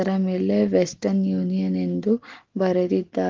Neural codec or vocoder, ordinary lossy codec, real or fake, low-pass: none; Opus, 16 kbps; real; 7.2 kHz